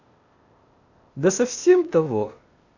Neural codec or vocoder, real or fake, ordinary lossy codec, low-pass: codec, 16 kHz, 0.8 kbps, ZipCodec; fake; none; 7.2 kHz